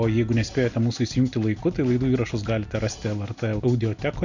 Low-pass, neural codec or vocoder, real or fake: 7.2 kHz; none; real